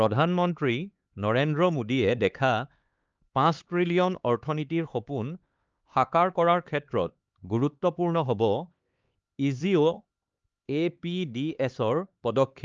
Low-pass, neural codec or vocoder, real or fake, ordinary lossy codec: 7.2 kHz; codec, 16 kHz, 4 kbps, X-Codec, HuBERT features, trained on LibriSpeech; fake; Opus, 24 kbps